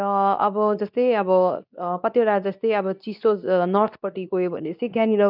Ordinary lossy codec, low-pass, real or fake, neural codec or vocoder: none; 5.4 kHz; fake; codec, 24 kHz, 0.9 kbps, WavTokenizer, medium speech release version 2